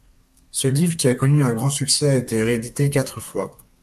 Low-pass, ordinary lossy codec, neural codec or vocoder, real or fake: 14.4 kHz; AAC, 96 kbps; codec, 32 kHz, 1.9 kbps, SNAC; fake